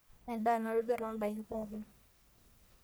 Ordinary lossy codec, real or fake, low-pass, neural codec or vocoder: none; fake; none; codec, 44.1 kHz, 1.7 kbps, Pupu-Codec